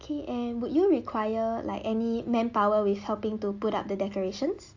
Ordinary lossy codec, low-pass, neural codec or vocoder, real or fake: AAC, 48 kbps; 7.2 kHz; none; real